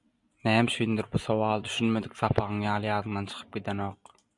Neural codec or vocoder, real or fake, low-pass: vocoder, 44.1 kHz, 128 mel bands every 256 samples, BigVGAN v2; fake; 10.8 kHz